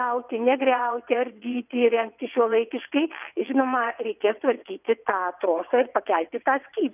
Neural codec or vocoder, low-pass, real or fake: vocoder, 22.05 kHz, 80 mel bands, WaveNeXt; 3.6 kHz; fake